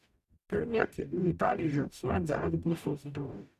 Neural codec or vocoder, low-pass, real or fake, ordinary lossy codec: codec, 44.1 kHz, 0.9 kbps, DAC; 14.4 kHz; fake; MP3, 96 kbps